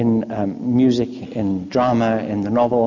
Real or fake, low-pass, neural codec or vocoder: real; 7.2 kHz; none